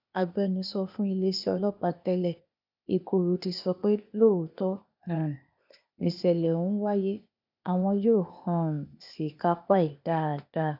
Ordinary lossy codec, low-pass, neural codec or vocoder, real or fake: none; 5.4 kHz; codec, 16 kHz, 0.8 kbps, ZipCodec; fake